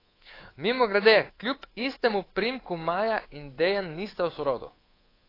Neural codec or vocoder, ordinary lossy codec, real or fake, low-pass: codec, 24 kHz, 3.1 kbps, DualCodec; AAC, 24 kbps; fake; 5.4 kHz